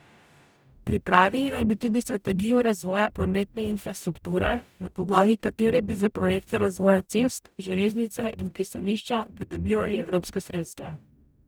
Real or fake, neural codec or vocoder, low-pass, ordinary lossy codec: fake; codec, 44.1 kHz, 0.9 kbps, DAC; none; none